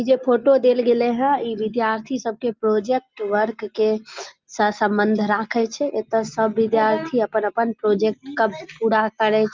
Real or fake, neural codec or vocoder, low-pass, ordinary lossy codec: real; none; 7.2 kHz; Opus, 24 kbps